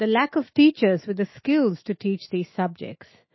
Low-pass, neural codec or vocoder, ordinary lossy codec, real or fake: 7.2 kHz; autoencoder, 48 kHz, 128 numbers a frame, DAC-VAE, trained on Japanese speech; MP3, 24 kbps; fake